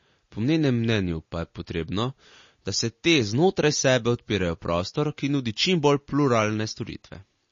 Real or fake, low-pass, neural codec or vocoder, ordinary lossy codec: real; 7.2 kHz; none; MP3, 32 kbps